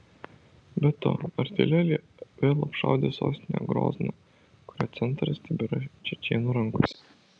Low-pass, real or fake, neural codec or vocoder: 9.9 kHz; real; none